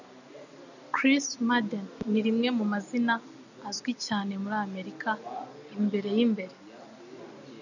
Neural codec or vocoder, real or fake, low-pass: none; real; 7.2 kHz